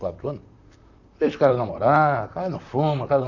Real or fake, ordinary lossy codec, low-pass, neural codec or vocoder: fake; none; 7.2 kHz; vocoder, 44.1 kHz, 128 mel bands, Pupu-Vocoder